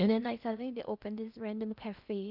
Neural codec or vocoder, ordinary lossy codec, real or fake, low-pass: codec, 16 kHz in and 24 kHz out, 0.8 kbps, FocalCodec, streaming, 65536 codes; Opus, 64 kbps; fake; 5.4 kHz